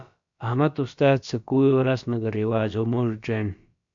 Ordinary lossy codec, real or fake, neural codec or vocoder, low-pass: MP3, 64 kbps; fake; codec, 16 kHz, about 1 kbps, DyCAST, with the encoder's durations; 7.2 kHz